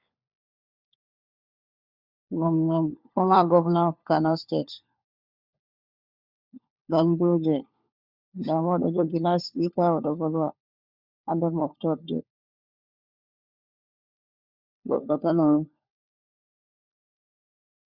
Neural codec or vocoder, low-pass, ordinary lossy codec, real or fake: codec, 16 kHz, 4 kbps, FunCodec, trained on LibriTTS, 50 frames a second; 5.4 kHz; Opus, 64 kbps; fake